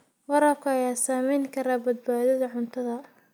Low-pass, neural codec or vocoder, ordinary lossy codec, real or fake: none; none; none; real